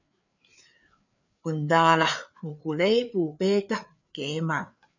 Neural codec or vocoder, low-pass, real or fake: codec, 16 kHz, 4 kbps, FreqCodec, larger model; 7.2 kHz; fake